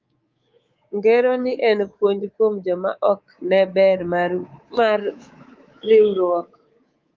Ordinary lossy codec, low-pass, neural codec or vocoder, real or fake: Opus, 32 kbps; 7.2 kHz; codec, 24 kHz, 3.1 kbps, DualCodec; fake